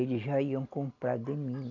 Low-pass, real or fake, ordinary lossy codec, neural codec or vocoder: 7.2 kHz; real; none; none